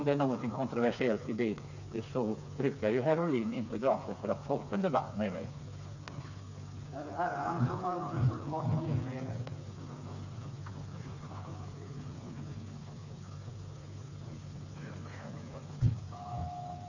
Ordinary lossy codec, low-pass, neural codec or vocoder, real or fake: none; 7.2 kHz; codec, 16 kHz, 4 kbps, FreqCodec, smaller model; fake